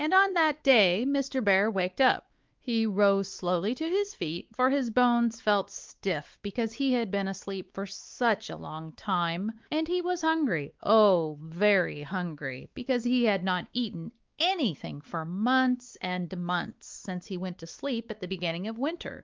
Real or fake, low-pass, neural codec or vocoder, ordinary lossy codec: fake; 7.2 kHz; codec, 16 kHz, 4 kbps, X-Codec, WavLM features, trained on Multilingual LibriSpeech; Opus, 32 kbps